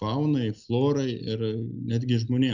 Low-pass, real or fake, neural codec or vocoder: 7.2 kHz; real; none